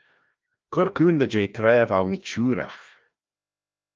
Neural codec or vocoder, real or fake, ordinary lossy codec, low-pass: codec, 16 kHz, 1 kbps, FreqCodec, larger model; fake; Opus, 24 kbps; 7.2 kHz